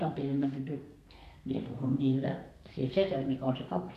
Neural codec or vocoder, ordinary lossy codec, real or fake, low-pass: codec, 32 kHz, 1.9 kbps, SNAC; none; fake; 14.4 kHz